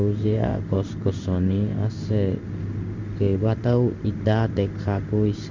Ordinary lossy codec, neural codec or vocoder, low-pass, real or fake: Opus, 64 kbps; codec, 16 kHz in and 24 kHz out, 1 kbps, XY-Tokenizer; 7.2 kHz; fake